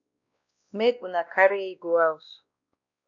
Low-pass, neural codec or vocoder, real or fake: 7.2 kHz; codec, 16 kHz, 1 kbps, X-Codec, WavLM features, trained on Multilingual LibriSpeech; fake